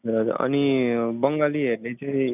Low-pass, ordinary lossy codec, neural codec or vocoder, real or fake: 3.6 kHz; none; none; real